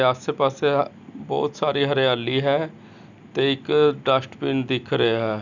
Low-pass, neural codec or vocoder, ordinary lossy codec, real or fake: 7.2 kHz; none; none; real